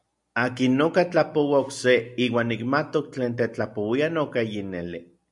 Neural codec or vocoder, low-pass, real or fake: none; 10.8 kHz; real